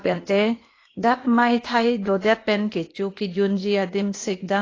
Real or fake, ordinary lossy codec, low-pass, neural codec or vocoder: fake; AAC, 32 kbps; 7.2 kHz; codec, 16 kHz, 0.8 kbps, ZipCodec